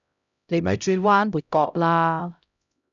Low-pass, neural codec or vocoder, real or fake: 7.2 kHz; codec, 16 kHz, 0.5 kbps, X-Codec, HuBERT features, trained on LibriSpeech; fake